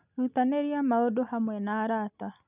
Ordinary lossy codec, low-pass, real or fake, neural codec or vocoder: none; 3.6 kHz; real; none